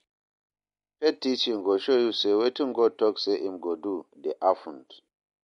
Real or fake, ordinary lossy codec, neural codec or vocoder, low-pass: real; MP3, 48 kbps; none; 14.4 kHz